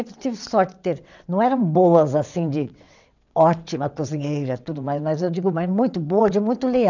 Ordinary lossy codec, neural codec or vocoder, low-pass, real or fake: none; vocoder, 22.05 kHz, 80 mel bands, WaveNeXt; 7.2 kHz; fake